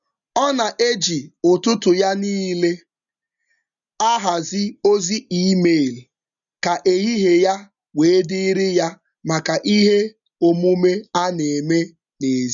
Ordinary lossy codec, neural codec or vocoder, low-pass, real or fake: none; none; 7.2 kHz; real